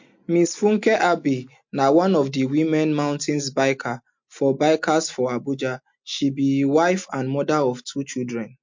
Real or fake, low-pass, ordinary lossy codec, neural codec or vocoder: real; 7.2 kHz; MP3, 48 kbps; none